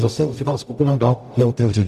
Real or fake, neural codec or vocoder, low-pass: fake; codec, 44.1 kHz, 0.9 kbps, DAC; 14.4 kHz